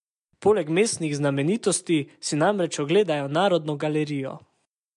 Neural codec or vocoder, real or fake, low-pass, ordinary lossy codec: none; real; 10.8 kHz; MP3, 64 kbps